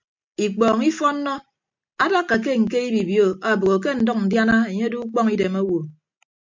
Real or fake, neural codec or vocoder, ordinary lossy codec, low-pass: real; none; MP3, 64 kbps; 7.2 kHz